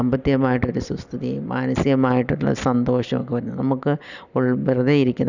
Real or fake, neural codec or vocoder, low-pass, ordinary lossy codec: real; none; 7.2 kHz; none